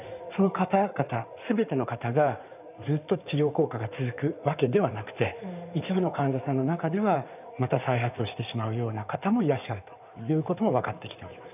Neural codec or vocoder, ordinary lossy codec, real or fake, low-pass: codec, 16 kHz in and 24 kHz out, 2.2 kbps, FireRedTTS-2 codec; none; fake; 3.6 kHz